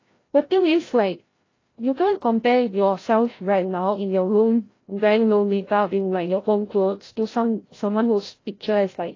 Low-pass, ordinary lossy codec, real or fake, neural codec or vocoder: 7.2 kHz; AAC, 32 kbps; fake; codec, 16 kHz, 0.5 kbps, FreqCodec, larger model